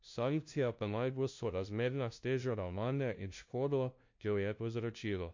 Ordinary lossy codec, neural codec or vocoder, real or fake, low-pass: MP3, 48 kbps; codec, 16 kHz, 0.5 kbps, FunCodec, trained on LibriTTS, 25 frames a second; fake; 7.2 kHz